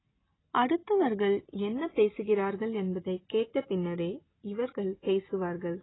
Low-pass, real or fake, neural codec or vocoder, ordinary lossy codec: 7.2 kHz; real; none; AAC, 16 kbps